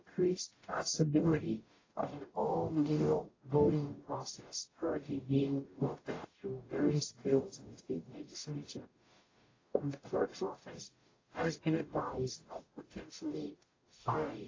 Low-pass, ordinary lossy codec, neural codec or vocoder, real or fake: 7.2 kHz; AAC, 32 kbps; codec, 44.1 kHz, 0.9 kbps, DAC; fake